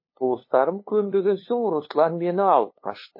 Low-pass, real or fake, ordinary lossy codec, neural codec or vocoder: 5.4 kHz; fake; MP3, 24 kbps; codec, 16 kHz, 2 kbps, FunCodec, trained on LibriTTS, 25 frames a second